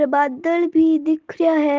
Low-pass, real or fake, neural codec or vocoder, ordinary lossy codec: 7.2 kHz; real; none; Opus, 16 kbps